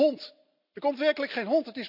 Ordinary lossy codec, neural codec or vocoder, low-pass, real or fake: none; none; 5.4 kHz; real